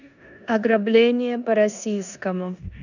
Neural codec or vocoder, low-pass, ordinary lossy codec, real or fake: codec, 16 kHz in and 24 kHz out, 0.9 kbps, LongCat-Audio-Codec, four codebook decoder; 7.2 kHz; none; fake